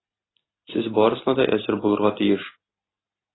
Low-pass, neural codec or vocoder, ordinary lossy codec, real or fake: 7.2 kHz; none; AAC, 16 kbps; real